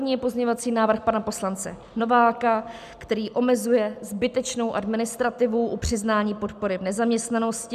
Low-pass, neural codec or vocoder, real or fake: 14.4 kHz; none; real